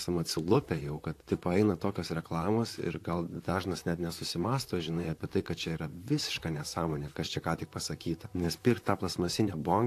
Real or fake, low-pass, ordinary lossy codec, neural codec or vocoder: fake; 14.4 kHz; AAC, 64 kbps; vocoder, 44.1 kHz, 128 mel bands, Pupu-Vocoder